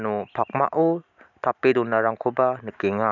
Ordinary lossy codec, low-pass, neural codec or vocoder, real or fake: none; 7.2 kHz; none; real